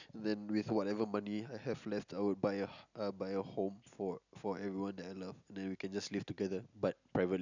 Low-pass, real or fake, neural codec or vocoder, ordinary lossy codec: 7.2 kHz; real; none; none